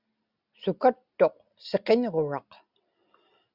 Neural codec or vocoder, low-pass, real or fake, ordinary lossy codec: none; 5.4 kHz; real; Opus, 64 kbps